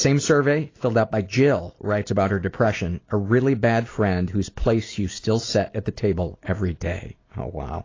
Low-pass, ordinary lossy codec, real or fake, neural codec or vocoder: 7.2 kHz; AAC, 32 kbps; real; none